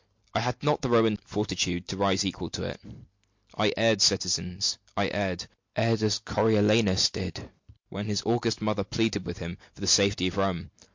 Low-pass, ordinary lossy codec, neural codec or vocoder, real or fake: 7.2 kHz; MP3, 48 kbps; none; real